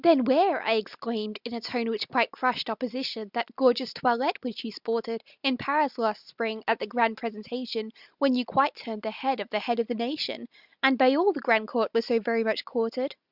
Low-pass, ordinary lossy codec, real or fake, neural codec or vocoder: 5.4 kHz; Opus, 64 kbps; real; none